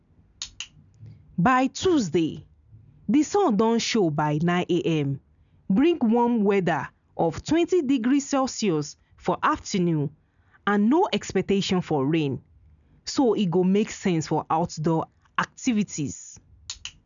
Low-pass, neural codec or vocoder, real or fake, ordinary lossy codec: 7.2 kHz; none; real; none